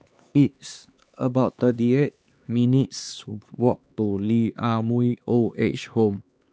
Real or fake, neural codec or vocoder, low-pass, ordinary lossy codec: fake; codec, 16 kHz, 2 kbps, X-Codec, HuBERT features, trained on LibriSpeech; none; none